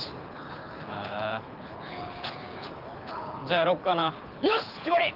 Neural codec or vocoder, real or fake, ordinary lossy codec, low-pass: codec, 24 kHz, 6 kbps, HILCodec; fake; Opus, 32 kbps; 5.4 kHz